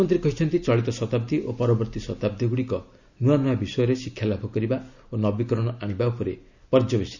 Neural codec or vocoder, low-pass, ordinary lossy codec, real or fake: none; 7.2 kHz; none; real